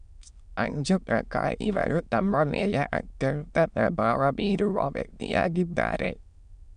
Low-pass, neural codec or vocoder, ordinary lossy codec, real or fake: 9.9 kHz; autoencoder, 22.05 kHz, a latent of 192 numbers a frame, VITS, trained on many speakers; none; fake